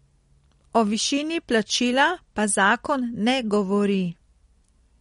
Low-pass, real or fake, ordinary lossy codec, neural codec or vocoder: 10.8 kHz; real; MP3, 48 kbps; none